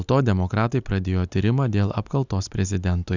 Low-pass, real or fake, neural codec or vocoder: 7.2 kHz; real; none